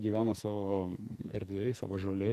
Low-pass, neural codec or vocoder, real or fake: 14.4 kHz; codec, 44.1 kHz, 2.6 kbps, SNAC; fake